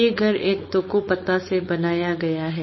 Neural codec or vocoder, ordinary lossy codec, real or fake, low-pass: codec, 16 kHz, 4.8 kbps, FACodec; MP3, 24 kbps; fake; 7.2 kHz